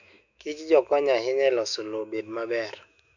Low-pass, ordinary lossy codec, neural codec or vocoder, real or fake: 7.2 kHz; none; autoencoder, 48 kHz, 128 numbers a frame, DAC-VAE, trained on Japanese speech; fake